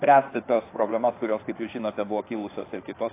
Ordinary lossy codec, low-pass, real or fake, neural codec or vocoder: AAC, 24 kbps; 3.6 kHz; fake; codec, 16 kHz in and 24 kHz out, 2.2 kbps, FireRedTTS-2 codec